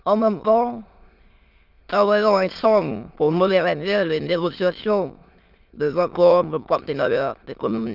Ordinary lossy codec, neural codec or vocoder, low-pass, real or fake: Opus, 32 kbps; autoencoder, 22.05 kHz, a latent of 192 numbers a frame, VITS, trained on many speakers; 5.4 kHz; fake